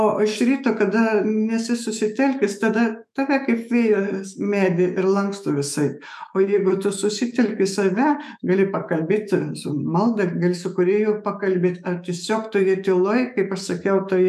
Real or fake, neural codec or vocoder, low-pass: fake; autoencoder, 48 kHz, 128 numbers a frame, DAC-VAE, trained on Japanese speech; 14.4 kHz